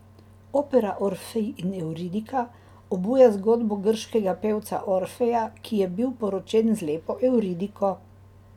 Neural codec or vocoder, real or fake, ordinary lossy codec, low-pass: none; real; none; 19.8 kHz